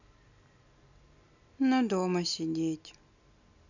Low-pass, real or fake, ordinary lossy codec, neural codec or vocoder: 7.2 kHz; real; none; none